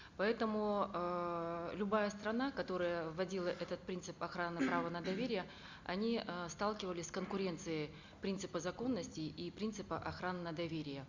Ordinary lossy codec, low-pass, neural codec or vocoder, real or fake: Opus, 64 kbps; 7.2 kHz; none; real